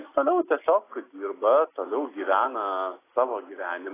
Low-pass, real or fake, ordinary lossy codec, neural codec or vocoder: 3.6 kHz; real; AAC, 16 kbps; none